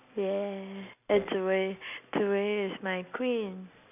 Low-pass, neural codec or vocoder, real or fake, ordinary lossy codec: 3.6 kHz; none; real; none